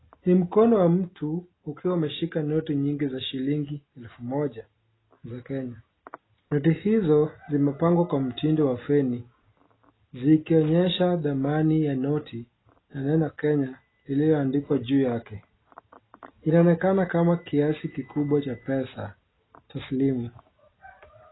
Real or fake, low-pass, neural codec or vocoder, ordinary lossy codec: real; 7.2 kHz; none; AAC, 16 kbps